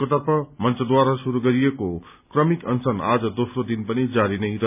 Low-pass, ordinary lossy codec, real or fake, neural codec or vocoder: 3.6 kHz; none; real; none